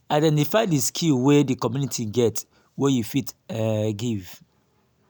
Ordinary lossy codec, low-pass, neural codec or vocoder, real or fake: none; none; none; real